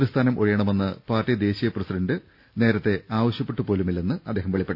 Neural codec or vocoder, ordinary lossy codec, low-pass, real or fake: none; none; 5.4 kHz; real